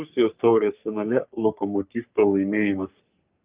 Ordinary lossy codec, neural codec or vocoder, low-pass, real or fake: Opus, 24 kbps; codec, 44.1 kHz, 3.4 kbps, Pupu-Codec; 3.6 kHz; fake